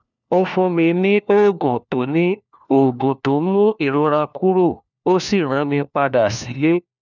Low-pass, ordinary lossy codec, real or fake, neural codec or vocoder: 7.2 kHz; none; fake; codec, 16 kHz, 1 kbps, FunCodec, trained on LibriTTS, 50 frames a second